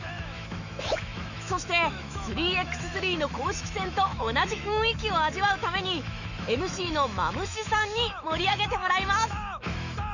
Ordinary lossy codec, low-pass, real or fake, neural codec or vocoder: none; 7.2 kHz; fake; autoencoder, 48 kHz, 128 numbers a frame, DAC-VAE, trained on Japanese speech